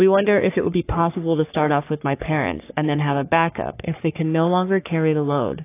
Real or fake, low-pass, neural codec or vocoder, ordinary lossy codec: fake; 3.6 kHz; codec, 44.1 kHz, 3.4 kbps, Pupu-Codec; AAC, 24 kbps